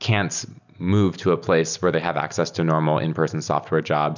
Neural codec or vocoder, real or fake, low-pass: none; real; 7.2 kHz